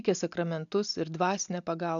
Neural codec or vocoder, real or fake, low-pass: none; real; 7.2 kHz